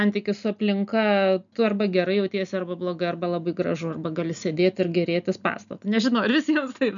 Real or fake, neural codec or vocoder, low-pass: real; none; 7.2 kHz